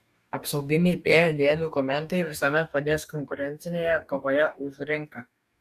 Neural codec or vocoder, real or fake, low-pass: codec, 44.1 kHz, 2.6 kbps, DAC; fake; 14.4 kHz